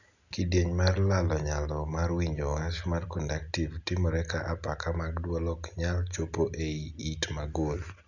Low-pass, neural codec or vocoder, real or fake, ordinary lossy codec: 7.2 kHz; none; real; none